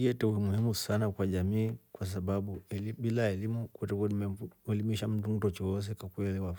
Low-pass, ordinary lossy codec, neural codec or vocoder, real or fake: none; none; none; real